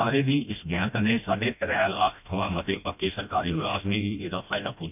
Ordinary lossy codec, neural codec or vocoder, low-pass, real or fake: none; codec, 16 kHz, 1 kbps, FreqCodec, smaller model; 3.6 kHz; fake